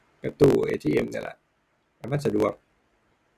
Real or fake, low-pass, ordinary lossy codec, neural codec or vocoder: real; 14.4 kHz; none; none